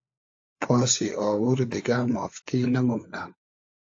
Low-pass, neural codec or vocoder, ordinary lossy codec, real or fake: 7.2 kHz; codec, 16 kHz, 4 kbps, FunCodec, trained on LibriTTS, 50 frames a second; AAC, 48 kbps; fake